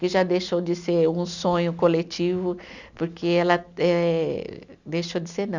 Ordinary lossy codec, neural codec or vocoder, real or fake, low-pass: none; none; real; 7.2 kHz